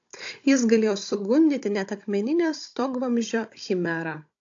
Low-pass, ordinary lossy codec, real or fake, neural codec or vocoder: 7.2 kHz; AAC, 48 kbps; fake; codec, 16 kHz, 16 kbps, FunCodec, trained on Chinese and English, 50 frames a second